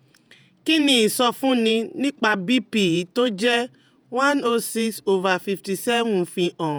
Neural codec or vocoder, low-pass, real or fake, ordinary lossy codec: vocoder, 48 kHz, 128 mel bands, Vocos; none; fake; none